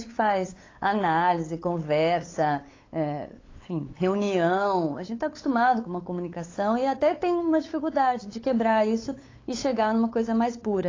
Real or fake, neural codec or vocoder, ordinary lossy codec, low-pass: fake; codec, 16 kHz, 8 kbps, FunCodec, trained on LibriTTS, 25 frames a second; AAC, 32 kbps; 7.2 kHz